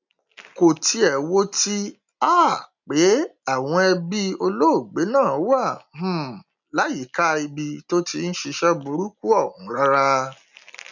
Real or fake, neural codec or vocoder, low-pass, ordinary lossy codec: real; none; 7.2 kHz; none